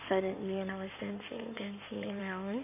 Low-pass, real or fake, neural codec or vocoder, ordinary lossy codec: 3.6 kHz; fake; codec, 16 kHz in and 24 kHz out, 2.2 kbps, FireRedTTS-2 codec; none